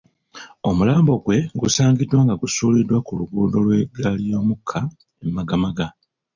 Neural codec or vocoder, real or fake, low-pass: none; real; 7.2 kHz